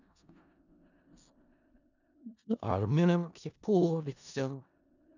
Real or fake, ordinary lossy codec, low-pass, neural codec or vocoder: fake; none; 7.2 kHz; codec, 16 kHz in and 24 kHz out, 0.4 kbps, LongCat-Audio-Codec, four codebook decoder